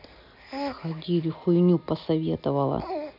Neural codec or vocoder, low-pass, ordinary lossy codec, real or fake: none; 5.4 kHz; none; real